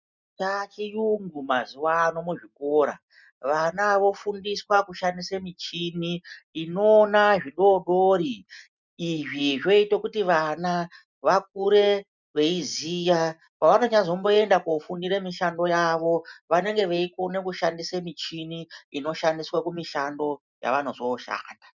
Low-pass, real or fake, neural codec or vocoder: 7.2 kHz; real; none